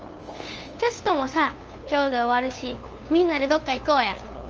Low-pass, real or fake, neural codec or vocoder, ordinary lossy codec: 7.2 kHz; fake; codec, 16 kHz, 2 kbps, FunCodec, trained on LibriTTS, 25 frames a second; Opus, 24 kbps